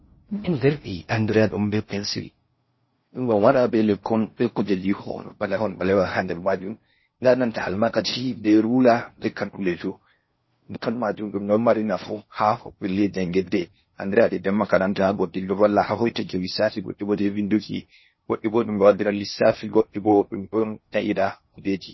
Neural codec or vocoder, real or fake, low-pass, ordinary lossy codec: codec, 16 kHz in and 24 kHz out, 0.6 kbps, FocalCodec, streaming, 4096 codes; fake; 7.2 kHz; MP3, 24 kbps